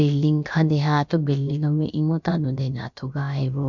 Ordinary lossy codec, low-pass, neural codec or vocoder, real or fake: none; 7.2 kHz; codec, 16 kHz, about 1 kbps, DyCAST, with the encoder's durations; fake